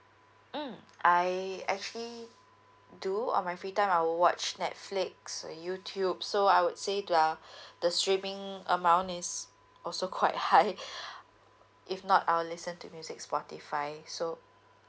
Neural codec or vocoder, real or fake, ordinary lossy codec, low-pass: none; real; none; none